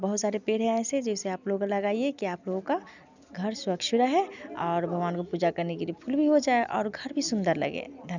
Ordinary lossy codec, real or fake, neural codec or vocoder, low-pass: none; real; none; 7.2 kHz